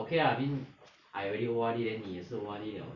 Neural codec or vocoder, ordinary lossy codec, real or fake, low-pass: none; none; real; 7.2 kHz